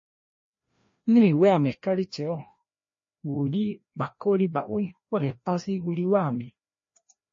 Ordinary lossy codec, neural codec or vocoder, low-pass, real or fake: MP3, 32 kbps; codec, 16 kHz, 1 kbps, FreqCodec, larger model; 7.2 kHz; fake